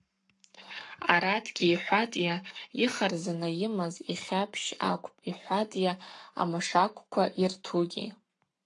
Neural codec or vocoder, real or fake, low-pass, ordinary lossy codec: codec, 44.1 kHz, 7.8 kbps, Pupu-Codec; fake; 10.8 kHz; AAC, 64 kbps